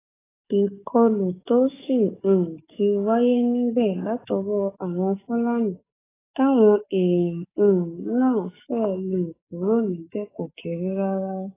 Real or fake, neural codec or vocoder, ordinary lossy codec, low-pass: fake; codec, 44.1 kHz, 7.8 kbps, DAC; AAC, 16 kbps; 3.6 kHz